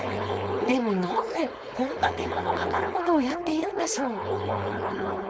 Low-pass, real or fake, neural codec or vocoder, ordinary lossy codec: none; fake; codec, 16 kHz, 4.8 kbps, FACodec; none